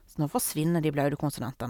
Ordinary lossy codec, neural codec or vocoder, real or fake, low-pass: none; none; real; none